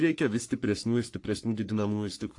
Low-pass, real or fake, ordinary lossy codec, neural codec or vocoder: 10.8 kHz; fake; AAC, 48 kbps; codec, 44.1 kHz, 3.4 kbps, Pupu-Codec